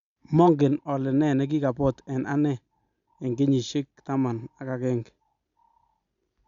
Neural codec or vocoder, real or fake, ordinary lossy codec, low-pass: none; real; Opus, 64 kbps; 7.2 kHz